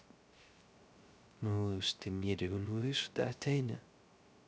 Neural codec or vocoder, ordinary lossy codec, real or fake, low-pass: codec, 16 kHz, 0.3 kbps, FocalCodec; none; fake; none